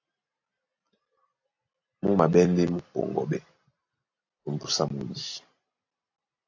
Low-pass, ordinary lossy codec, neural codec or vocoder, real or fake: 7.2 kHz; AAC, 48 kbps; none; real